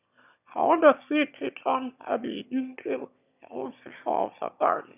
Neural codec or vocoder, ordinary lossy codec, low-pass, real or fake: autoencoder, 22.05 kHz, a latent of 192 numbers a frame, VITS, trained on one speaker; none; 3.6 kHz; fake